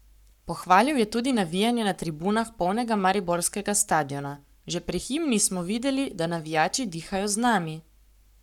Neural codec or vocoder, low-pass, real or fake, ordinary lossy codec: codec, 44.1 kHz, 7.8 kbps, Pupu-Codec; 19.8 kHz; fake; none